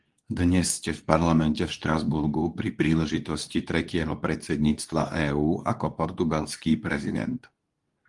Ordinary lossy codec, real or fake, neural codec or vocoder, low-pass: Opus, 24 kbps; fake; codec, 24 kHz, 0.9 kbps, WavTokenizer, medium speech release version 2; 10.8 kHz